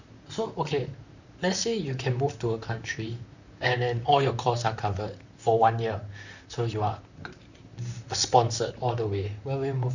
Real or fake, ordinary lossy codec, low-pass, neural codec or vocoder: fake; none; 7.2 kHz; vocoder, 44.1 kHz, 128 mel bands, Pupu-Vocoder